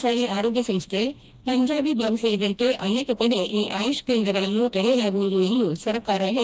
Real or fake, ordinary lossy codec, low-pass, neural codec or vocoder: fake; none; none; codec, 16 kHz, 1 kbps, FreqCodec, smaller model